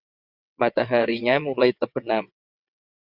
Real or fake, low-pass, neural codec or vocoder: fake; 5.4 kHz; vocoder, 22.05 kHz, 80 mel bands, WaveNeXt